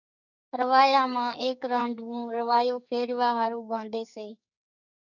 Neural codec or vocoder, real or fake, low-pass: codec, 44.1 kHz, 2.6 kbps, SNAC; fake; 7.2 kHz